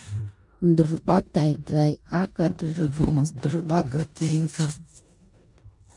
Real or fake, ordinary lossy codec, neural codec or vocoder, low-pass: fake; MP3, 96 kbps; codec, 16 kHz in and 24 kHz out, 0.9 kbps, LongCat-Audio-Codec, four codebook decoder; 10.8 kHz